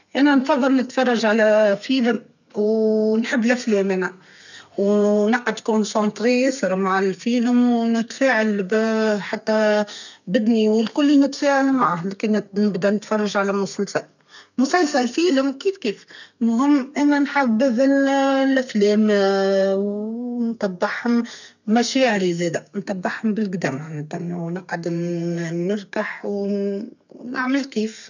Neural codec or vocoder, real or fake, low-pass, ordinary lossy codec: codec, 32 kHz, 1.9 kbps, SNAC; fake; 7.2 kHz; none